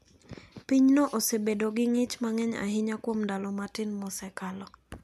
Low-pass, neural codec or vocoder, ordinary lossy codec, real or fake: 14.4 kHz; none; none; real